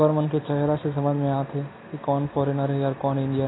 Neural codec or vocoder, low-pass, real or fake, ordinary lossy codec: none; 7.2 kHz; real; AAC, 16 kbps